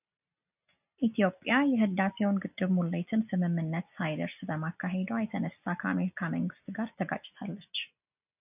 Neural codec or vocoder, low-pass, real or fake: none; 3.6 kHz; real